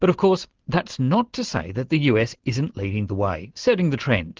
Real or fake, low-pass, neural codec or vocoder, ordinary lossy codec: real; 7.2 kHz; none; Opus, 16 kbps